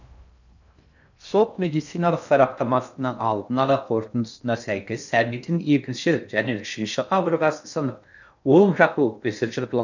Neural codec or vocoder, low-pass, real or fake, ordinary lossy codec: codec, 16 kHz in and 24 kHz out, 0.6 kbps, FocalCodec, streaming, 2048 codes; 7.2 kHz; fake; none